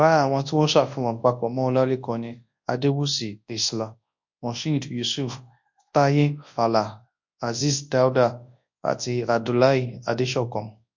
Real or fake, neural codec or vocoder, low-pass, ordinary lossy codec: fake; codec, 24 kHz, 0.9 kbps, WavTokenizer, large speech release; 7.2 kHz; MP3, 48 kbps